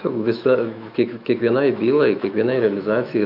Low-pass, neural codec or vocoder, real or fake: 5.4 kHz; autoencoder, 48 kHz, 128 numbers a frame, DAC-VAE, trained on Japanese speech; fake